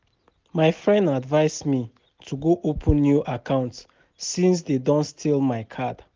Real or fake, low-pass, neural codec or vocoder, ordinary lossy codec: real; 7.2 kHz; none; Opus, 32 kbps